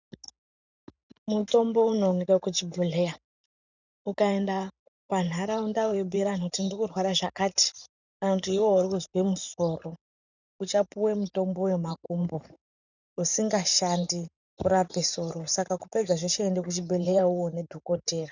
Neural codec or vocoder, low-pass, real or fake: vocoder, 44.1 kHz, 128 mel bands every 512 samples, BigVGAN v2; 7.2 kHz; fake